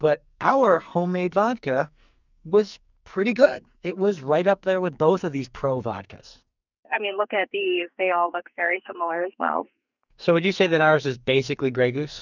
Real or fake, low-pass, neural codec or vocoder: fake; 7.2 kHz; codec, 44.1 kHz, 2.6 kbps, SNAC